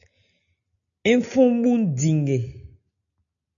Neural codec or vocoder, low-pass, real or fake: none; 7.2 kHz; real